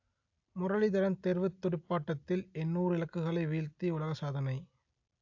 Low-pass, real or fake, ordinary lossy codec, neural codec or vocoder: 7.2 kHz; real; AAC, 48 kbps; none